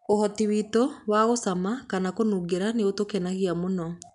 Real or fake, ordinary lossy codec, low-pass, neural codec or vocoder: real; none; 10.8 kHz; none